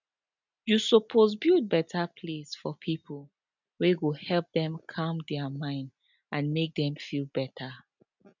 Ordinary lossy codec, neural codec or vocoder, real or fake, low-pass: none; none; real; 7.2 kHz